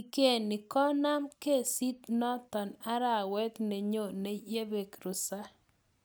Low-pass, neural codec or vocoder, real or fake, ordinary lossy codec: none; none; real; none